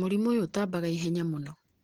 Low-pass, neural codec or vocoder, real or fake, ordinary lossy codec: 19.8 kHz; none; real; Opus, 16 kbps